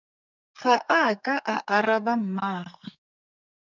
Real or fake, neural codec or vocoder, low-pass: fake; codec, 32 kHz, 1.9 kbps, SNAC; 7.2 kHz